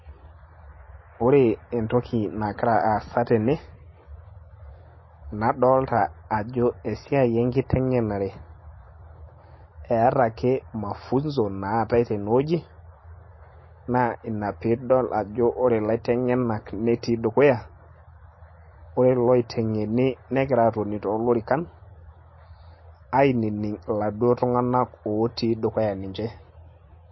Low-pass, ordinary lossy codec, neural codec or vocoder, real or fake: 7.2 kHz; MP3, 24 kbps; none; real